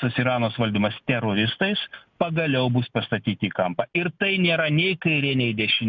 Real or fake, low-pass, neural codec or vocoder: real; 7.2 kHz; none